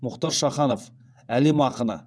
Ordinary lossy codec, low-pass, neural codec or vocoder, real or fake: none; none; vocoder, 22.05 kHz, 80 mel bands, WaveNeXt; fake